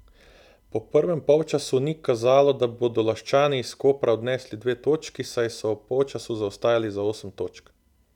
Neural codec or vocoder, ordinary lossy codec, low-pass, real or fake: none; none; 19.8 kHz; real